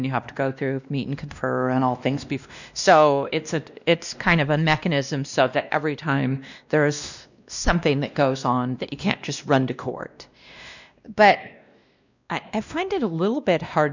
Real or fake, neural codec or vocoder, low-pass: fake; codec, 16 kHz, 1 kbps, X-Codec, WavLM features, trained on Multilingual LibriSpeech; 7.2 kHz